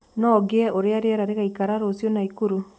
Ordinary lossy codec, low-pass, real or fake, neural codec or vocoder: none; none; real; none